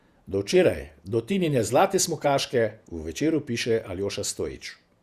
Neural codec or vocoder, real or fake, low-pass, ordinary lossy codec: vocoder, 48 kHz, 128 mel bands, Vocos; fake; 14.4 kHz; Opus, 64 kbps